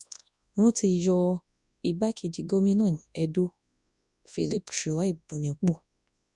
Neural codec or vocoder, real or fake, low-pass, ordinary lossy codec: codec, 24 kHz, 0.9 kbps, WavTokenizer, large speech release; fake; 10.8 kHz; none